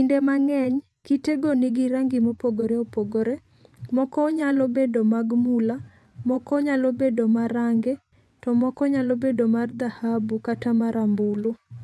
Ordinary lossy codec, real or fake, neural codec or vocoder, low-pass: none; fake; vocoder, 24 kHz, 100 mel bands, Vocos; none